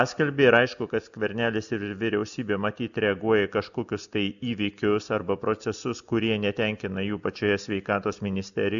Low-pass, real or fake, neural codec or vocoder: 7.2 kHz; real; none